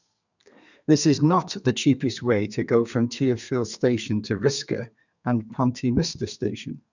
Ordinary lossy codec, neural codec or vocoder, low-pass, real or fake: none; codec, 32 kHz, 1.9 kbps, SNAC; 7.2 kHz; fake